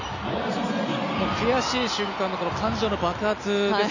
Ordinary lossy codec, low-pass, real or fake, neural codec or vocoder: none; 7.2 kHz; real; none